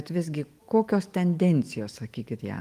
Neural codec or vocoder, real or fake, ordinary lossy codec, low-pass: autoencoder, 48 kHz, 128 numbers a frame, DAC-VAE, trained on Japanese speech; fake; Opus, 24 kbps; 14.4 kHz